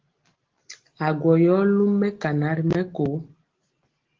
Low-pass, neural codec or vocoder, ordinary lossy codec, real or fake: 7.2 kHz; none; Opus, 16 kbps; real